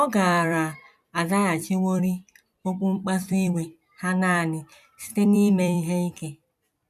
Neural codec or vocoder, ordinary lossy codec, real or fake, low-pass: vocoder, 44.1 kHz, 128 mel bands every 256 samples, BigVGAN v2; none; fake; 14.4 kHz